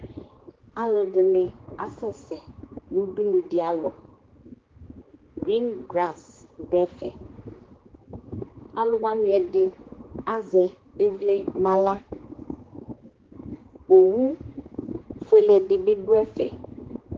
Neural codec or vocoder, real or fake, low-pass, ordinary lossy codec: codec, 16 kHz, 2 kbps, X-Codec, HuBERT features, trained on general audio; fake; 7.2 kHz; Opus, 16 kbps